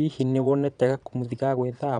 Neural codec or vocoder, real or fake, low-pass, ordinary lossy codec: vocoder, 22.05 kHz, 80 mel bands, Vocos; fake; 9.9 kHz; none